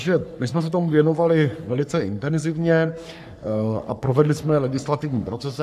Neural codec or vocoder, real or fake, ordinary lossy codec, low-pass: codec, 44.1 kHz, 3.4 kbps, Pupu-Codec; fake; AAC, 96 kbps; 14.4 kHz